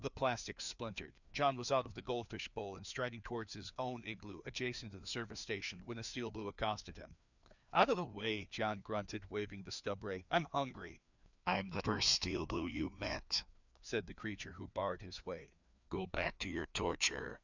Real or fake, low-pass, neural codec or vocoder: fake; 7.2 kHz; codec, 16 kHz, 2 kbps, FreqCodec, larger model